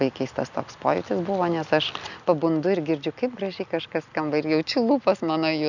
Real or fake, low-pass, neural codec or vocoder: real; 7.2 kHz; none